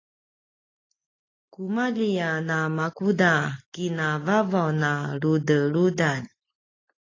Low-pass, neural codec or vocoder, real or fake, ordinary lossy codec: 7.2 kHz; none; real; AAC, 32 kbps